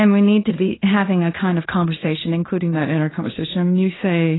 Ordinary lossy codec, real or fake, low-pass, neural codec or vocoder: AAC, 16 kbps; fake; 7.2 kHz; codec, 16 kHz in and 24 kHz out, 0.9 kbps, LongCat-Audio-Codec, fine tuned four codebook decoder